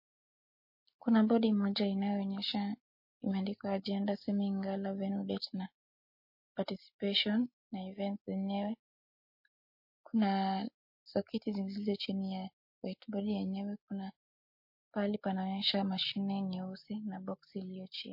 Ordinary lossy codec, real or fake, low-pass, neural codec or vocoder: MP3, 32 kbps; real; 5.4 kHz; none